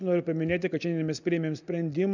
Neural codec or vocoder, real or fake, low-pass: none; real; 7.2 kHz